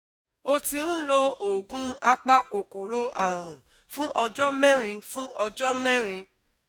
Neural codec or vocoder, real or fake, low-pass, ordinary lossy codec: codec, 44.1 kHz, 2.6 kbps, DAC; fake; 19.8 kHz; none